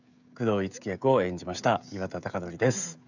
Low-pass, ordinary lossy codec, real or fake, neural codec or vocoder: 7.2 kHz; none; fake; codec, 16 kHz, 16 kbps, FreqCodec, smaller model